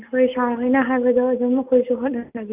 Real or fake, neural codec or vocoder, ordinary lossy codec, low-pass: real; none; Opus, 64 kbps; 3.6 kHz